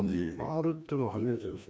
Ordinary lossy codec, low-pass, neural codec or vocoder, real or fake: none; none; codec, 16 kHz, 1 kbps, FreqCodec, larger model; fake